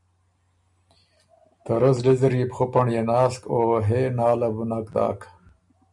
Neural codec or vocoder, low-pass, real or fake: none; 10.8 kHz; real